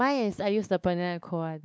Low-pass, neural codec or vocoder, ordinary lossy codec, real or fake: none; codec, 16 kHz, 4 kbps, X-Codec, WavLM features, trained on Multilingual LibriSpeech; none; fake